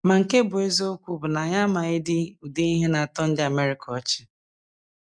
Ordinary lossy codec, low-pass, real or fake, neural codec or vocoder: none; 9.9 kHz; real; none